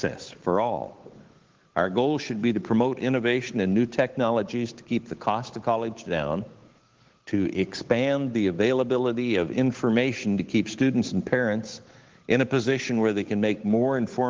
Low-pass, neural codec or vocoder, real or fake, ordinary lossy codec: 7.2 kHz; codec, 16 kHz, 8 kbps, FunCodec, trained on Chinese and English, 25 frames a second; fake; Opus, 16 kbps